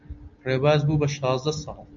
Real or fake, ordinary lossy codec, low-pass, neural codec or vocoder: real; AAC, 64 kbps; 7.2 kHz; none